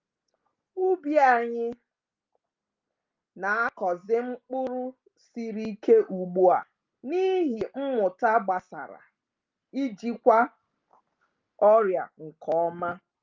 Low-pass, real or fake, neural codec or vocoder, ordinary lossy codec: 7.2 kHz; real; none; Opus, 24 kbps